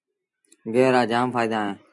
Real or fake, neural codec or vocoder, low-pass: real; none; 10.8 kHz